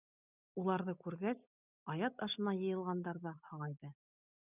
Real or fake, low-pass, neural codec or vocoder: real; 3.6 kHz; none